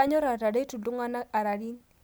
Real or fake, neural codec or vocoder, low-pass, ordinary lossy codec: real; none; none; none